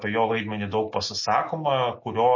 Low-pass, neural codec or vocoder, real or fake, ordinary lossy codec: 7.2 kHz; none; real; MP3, 32 kbps